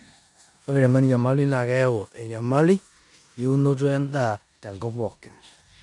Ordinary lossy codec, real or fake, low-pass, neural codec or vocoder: none; fake; 10.8 kHz; codec, 16 kHz in and 24 kHz out, 0.9 kbps, LongCat-Audio-Codec, four codebook decoder